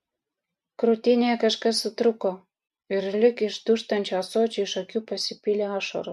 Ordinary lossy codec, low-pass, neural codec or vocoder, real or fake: MP3, 64 kbps; 14.4 kHz; none; real